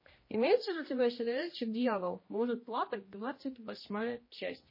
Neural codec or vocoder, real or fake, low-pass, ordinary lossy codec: codec, 16 kHz, 1 kbps, X-Codec, HuBERT features, trained on general audio; fake; 5.4 kHz; MP3, 24 kbps